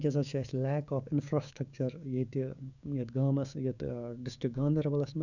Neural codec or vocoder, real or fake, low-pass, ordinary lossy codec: codec, 16 kHz, 6 kbps, DAC; fake; 7.2 kHz; none